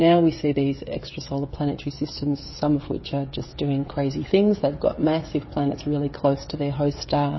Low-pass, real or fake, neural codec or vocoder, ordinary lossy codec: 7.2 kHz; fake; codec, 16 kHz, 16 kbps, FreqCodec, smaller model; MP3, 24 kbps